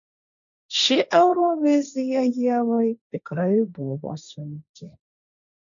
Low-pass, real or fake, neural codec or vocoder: 7.2 kHz; fake; codec, 16 kHz, 1.1 kbps, Voila-Tokenizer